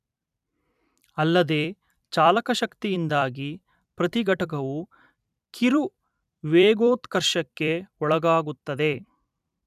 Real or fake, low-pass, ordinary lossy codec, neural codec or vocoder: fake; 14.4 kHz; none; vocoder, 44.1 kHz, 128 mel bands every 256 samples, BigVGAN v2